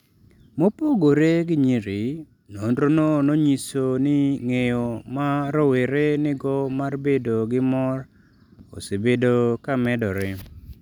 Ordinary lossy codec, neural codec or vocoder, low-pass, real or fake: none; none; 19.8 kHz; real